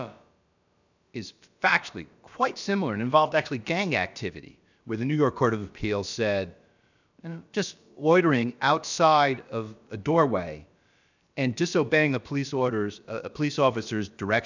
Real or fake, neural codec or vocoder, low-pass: fake; codec, 16 kHz, about 1 kbps, DyCAST, with the encoder's durations; 7.2 kHz